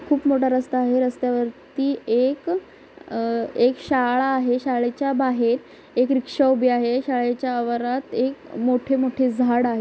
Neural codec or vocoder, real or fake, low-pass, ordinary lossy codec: none; real; none; none